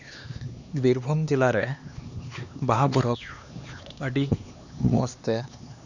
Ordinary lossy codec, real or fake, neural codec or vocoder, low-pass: none; fake; codec, 16 kHz, 2 kbps, X-Codec, HuBERT features, trained on LibriSpeech; 7.2 kHz